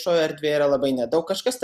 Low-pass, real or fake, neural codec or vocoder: 14.4 kHz; real; none